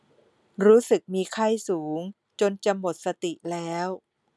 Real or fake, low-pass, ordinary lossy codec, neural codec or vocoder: real; none; none; none